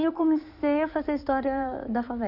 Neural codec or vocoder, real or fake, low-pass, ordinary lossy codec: codec, 16 kHz in and 24 kHz out, 2.2 kbps, FireRedTTS-2 codec; fake; 5.4 kHz; none